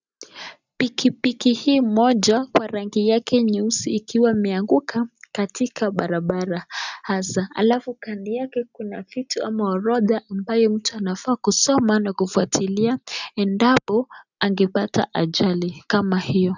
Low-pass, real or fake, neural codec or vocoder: 7.2 kHz; real; none